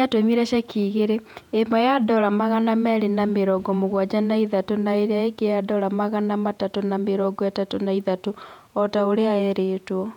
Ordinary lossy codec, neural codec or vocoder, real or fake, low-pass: none; vocoder, 48 kHz, 128 mel bands, Vocos; fake; 19.8 kHz